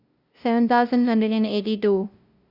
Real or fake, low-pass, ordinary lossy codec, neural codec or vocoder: fake; 5.4 kHz; Opus, 64 kbps; codec, 16 kHz, 0.5 kbps, FunCodec, trained on LibriTTS, 25 frames a second